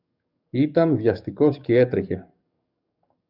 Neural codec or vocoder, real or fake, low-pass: codec, 44.1 kHz, 7.8 kbps, DAC; fake; 5.4 kHz